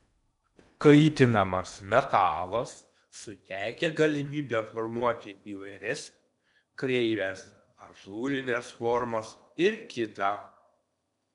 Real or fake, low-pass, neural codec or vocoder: fake; 10.8 kHz; codec, 16 kHz in and 24 kHz out, 0.8 kbps, FocalCodec, streaming, 65536 codes